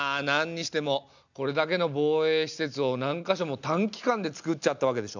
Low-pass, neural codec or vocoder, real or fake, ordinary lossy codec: 7.2 kHz; none; real; none